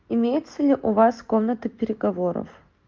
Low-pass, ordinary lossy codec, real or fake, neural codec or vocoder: 7.2 kHz; Opus, 32 kbps; real; none